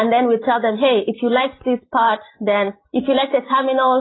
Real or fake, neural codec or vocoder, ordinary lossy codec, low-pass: real; none; AAC, 16 kbps; 7.2 kHz